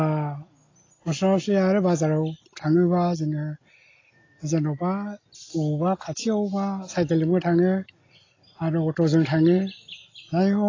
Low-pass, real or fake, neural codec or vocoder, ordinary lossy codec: 7.2 kHz; real; none; AAC, 32 kbps